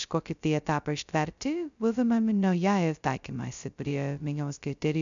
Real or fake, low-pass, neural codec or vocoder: fake; 7.2 kHz; codec, 16 kHz, 0.2 kbps, FocalCodec